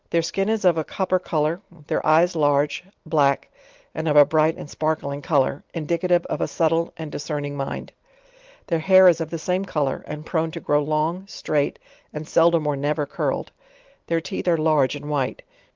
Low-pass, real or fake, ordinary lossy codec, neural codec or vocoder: 7.2 kHz; real; Opus, 32 kbps; none